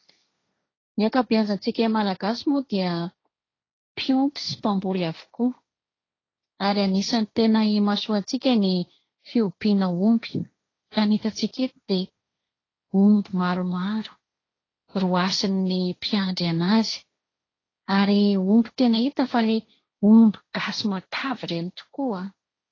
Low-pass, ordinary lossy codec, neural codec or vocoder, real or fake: 7.2 kHz; AAC, 32 kbps; codec, 16 kHz, 1.1 kbps, Voila-Tokenizer; fake